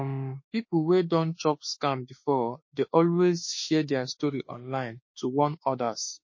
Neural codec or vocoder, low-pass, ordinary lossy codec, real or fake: autoencoder, 48 kHz, 32 numbers a frame, DAC-VAE, trained on Japanese speech; 7.2 kHz; MP3, 32 kbps; fake